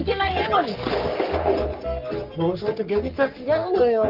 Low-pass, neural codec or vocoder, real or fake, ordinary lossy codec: 5.4 kHz; codec, 44.1 kHz, 3.4 kbps, Pupu-Codec; fake; Opus, 24 kbps